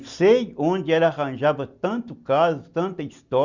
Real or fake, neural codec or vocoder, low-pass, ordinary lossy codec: real; none; 7.2 kHz; Opus, 64 kbps